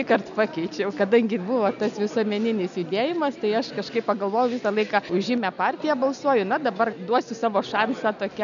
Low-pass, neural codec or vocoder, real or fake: 7.2 kHz; none; real